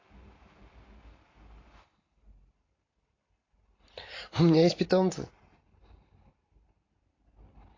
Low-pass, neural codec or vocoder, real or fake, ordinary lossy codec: 7.2 kHz; none; real; AAC, 32 kbps